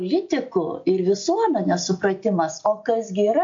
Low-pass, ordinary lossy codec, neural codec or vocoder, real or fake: 7.2 kHz; MP3, 48 kbps; none; real